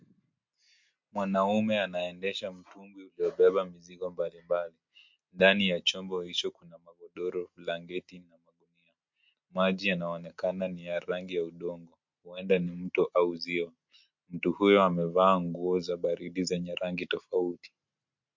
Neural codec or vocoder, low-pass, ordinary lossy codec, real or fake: none; 7.2 kHz; MP3, 48 kbps; real